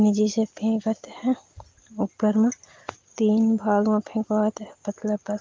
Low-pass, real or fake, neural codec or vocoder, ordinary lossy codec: 7.2 kHz; real; none; Opus, 32 kbps